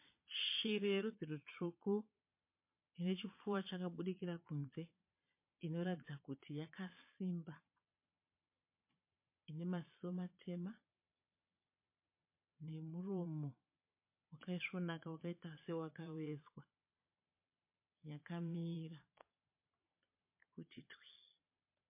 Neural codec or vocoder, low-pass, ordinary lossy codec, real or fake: vocoder, 22.05 kHz, 80 mel bands, Vocos; 3.6 kHz; MP3, 24 kbps; fake